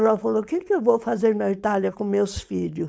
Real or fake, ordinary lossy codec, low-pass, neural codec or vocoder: fake; none; none; codec, 16 kHz, 4.8 kbps, FACodec